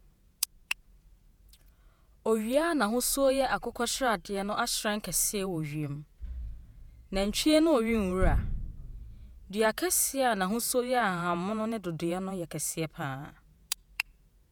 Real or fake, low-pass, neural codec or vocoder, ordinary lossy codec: fake; none; vocoder, 48 kHz, 128 mel bands, Vocos; none